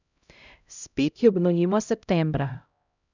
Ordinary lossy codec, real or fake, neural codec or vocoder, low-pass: none; fake; codec, 16 kHz, 0.5 kbps, X-Codec, HuBERT features, trained on LibriSpeech; 7.2 kHz